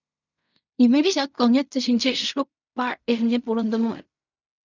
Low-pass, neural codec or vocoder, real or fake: 7.2 kHz; codec, 16 kHz in and 24 kHz out, 0.4 kbps, LongCat-Audio-Codec, fine tuned four codebook decoder; fake